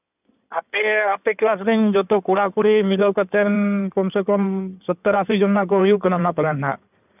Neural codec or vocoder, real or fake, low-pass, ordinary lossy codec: codec, 16 kHz in and 24 kHz out, 2.2 kbps, FireRedTTS-2 codec; fake; 3.6 kHz; none